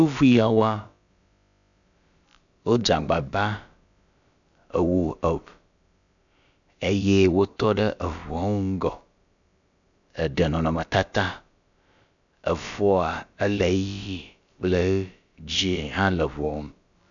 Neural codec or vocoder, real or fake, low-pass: codec, 16 kHz, about 1 kbps, DyCAST, with the encoder's durations; fake; 7.2 kHz